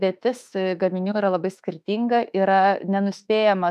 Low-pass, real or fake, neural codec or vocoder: 14.4 kHz; fake; autoencoder, 48 kHz, 32 numbers a frame, DAC-VAE, trained on Japanese speech